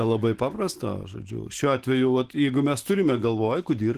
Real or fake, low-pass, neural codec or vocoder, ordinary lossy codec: fake; 14.4 kHz; autoencoder, 48 kHz, 128 numbers a frame, DAC-VAE, trained on Japanese speech; Opus, 16 kbps